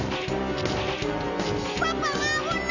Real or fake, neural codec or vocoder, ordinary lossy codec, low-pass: real; none; none; 7.2 kHz